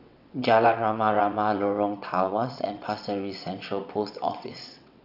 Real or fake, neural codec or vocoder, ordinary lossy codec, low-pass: fake; vocoder, 22.05 kHz, 80 mel bands, WaveNeXt; none; 5.4 kHz